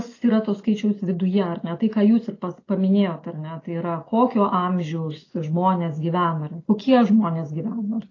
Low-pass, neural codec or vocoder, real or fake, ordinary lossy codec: 7.2 kHz; none; real; AAC, 32 kbps